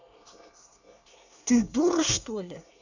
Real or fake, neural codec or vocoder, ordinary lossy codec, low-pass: fake; codec, 24 kHz, 1 kbps, SNAC; none; 7.2 kHz